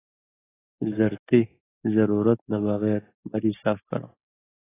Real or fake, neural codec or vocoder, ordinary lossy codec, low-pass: real; none; AAC, 16 kbps; 3.6 kHz